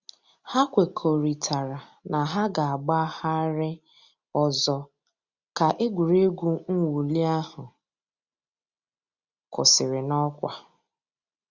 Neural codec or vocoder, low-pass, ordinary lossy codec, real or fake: none; 7.2 kHz; Opus, 64 kbps; real